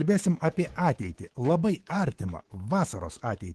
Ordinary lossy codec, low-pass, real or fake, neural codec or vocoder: Opus, 16 kbps; 10.8 kHz; fake; codec, 24 kHz, 3.1 kbps, DualCodec